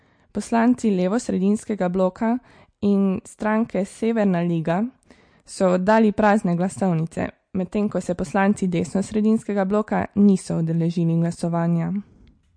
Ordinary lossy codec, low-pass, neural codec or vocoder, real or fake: MP3, 48 kbps; 9.9 kHz; none; real